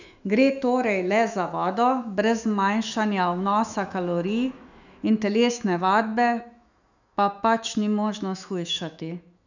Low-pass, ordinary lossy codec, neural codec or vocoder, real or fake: 7.2 kHz; none; autoencoder, 48 kHz, 128 numbers a frame, DAC-VAE, trained on Japanese speech; fake